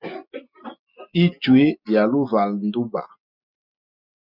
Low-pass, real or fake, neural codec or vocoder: 5.4 kHz; real; none